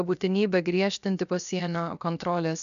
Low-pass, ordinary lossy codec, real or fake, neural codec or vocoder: 7.2 kHz; AAC, 96 kbps; fake; codec, 16 kHz, 0.7 kbps, FocalCodec